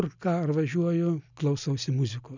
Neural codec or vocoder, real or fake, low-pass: none; real; 7.2 kHz